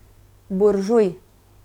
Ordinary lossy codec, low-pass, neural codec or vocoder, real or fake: none; 19.8 kHz; codec, 44.1 kHz, 7.8 kbps, DAC; fake